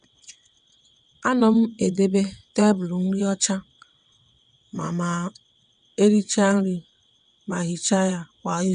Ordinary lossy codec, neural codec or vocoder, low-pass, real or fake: none; vocoder, 22.05 kHz, 80 mel bands, WaveNeXt; 9.9 kHz; fake